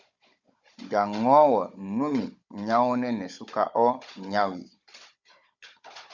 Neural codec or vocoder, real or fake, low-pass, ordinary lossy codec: codec, 16 kHz, 16 kbps, FunCodec, trained on Chinese and English, 50 frames a second; fake; 7.2 kHz; Opus, 64 kbps